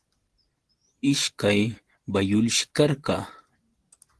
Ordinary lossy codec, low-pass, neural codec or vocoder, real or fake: Opus, 16 kbps; 10.8 kHz; none; real